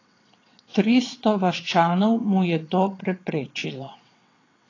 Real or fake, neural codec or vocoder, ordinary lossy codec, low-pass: fake; vocoder, 22.05 kHz, 80 mel bands, WaveNeXt; AAC, 32 kbps; 7.2 kHz